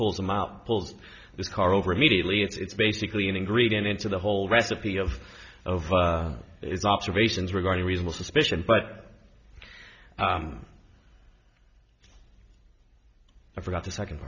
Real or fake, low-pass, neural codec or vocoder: real; 7.2 kHz; none